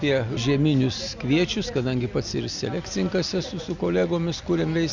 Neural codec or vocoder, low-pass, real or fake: none; 7.2 kHz; real